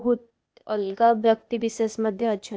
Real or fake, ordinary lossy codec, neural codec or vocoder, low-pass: fake; none; codec, 16 kHz, 0.8 kbps, ZipCodec; none